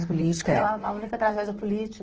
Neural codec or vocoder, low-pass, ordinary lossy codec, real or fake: vocoder, 44.1 kHz, 128 mel bands, Pupu-Vocoder; 7.2 kHz; Opus, 16 kbps; fake